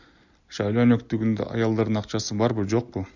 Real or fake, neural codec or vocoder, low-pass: real; none; 7.2 kHz